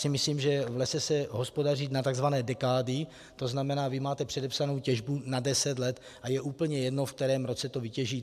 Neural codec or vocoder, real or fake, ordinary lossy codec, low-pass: none; real; AAC, 96 kbps; 14.4 kHz